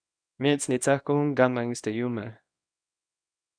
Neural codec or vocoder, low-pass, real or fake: codec, 24 kHz, 0.9 kbps, WavTokenizer, small release; 9.9 kHz; fake